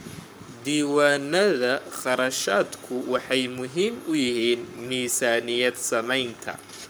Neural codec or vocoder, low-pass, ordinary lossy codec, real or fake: codec, 44.1 kHz, 7.8 kbps, Pupu-Codec; none; none; fake